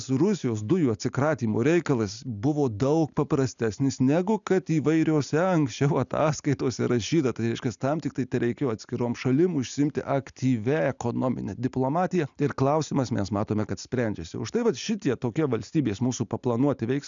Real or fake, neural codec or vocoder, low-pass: real; none; 7.2 kHz